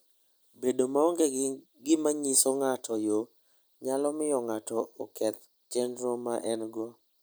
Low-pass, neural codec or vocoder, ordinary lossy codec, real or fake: none; none; none; real